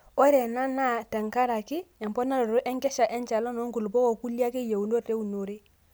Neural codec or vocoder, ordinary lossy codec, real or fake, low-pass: none; none; real; none